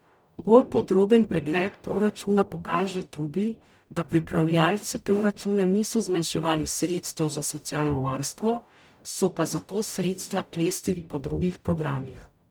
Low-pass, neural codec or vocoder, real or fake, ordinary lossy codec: none; codec, 44.1 kHz, 0.9 kbps, DAC; fake; none